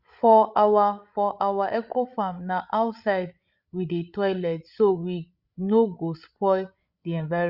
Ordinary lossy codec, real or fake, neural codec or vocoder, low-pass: Opus, 64 kbps; fake; codec, 16 kHz, 16 kbps, FreqCodec, larger model; 5.4 kHz